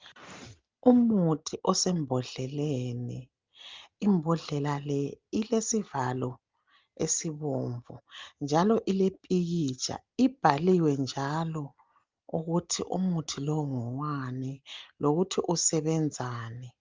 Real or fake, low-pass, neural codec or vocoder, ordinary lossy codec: real; 7.2 kHz; none; Opus, 32 kbps